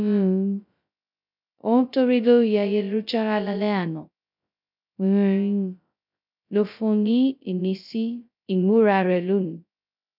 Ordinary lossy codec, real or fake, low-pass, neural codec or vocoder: none; fake; 5.4 kHz; codec, 16 kHz, 0.2 kbps, FocalCodec